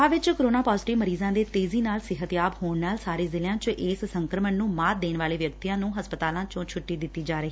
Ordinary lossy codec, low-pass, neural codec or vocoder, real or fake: none; none; none; real